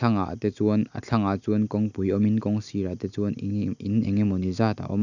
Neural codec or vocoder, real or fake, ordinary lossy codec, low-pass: none; real; none; 7.2 kHz